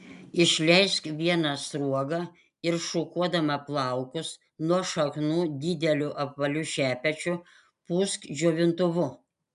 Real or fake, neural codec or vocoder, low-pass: real; none; 10.8 kHz